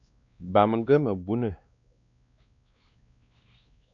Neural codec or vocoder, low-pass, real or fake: codec, 16 kHz, 2 kbps, X-Codec, WavLM features, trained on Multilingual LibriSpeech; 7.2 kHz; fake